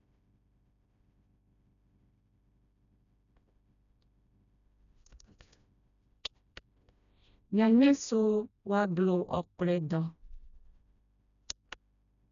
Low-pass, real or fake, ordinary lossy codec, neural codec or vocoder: 7.2 kHz; fake; none; codec, 16 kHz, 1 kbps, FreqCodec, smaller model